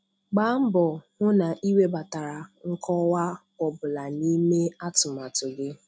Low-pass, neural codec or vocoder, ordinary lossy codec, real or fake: none; none; none; real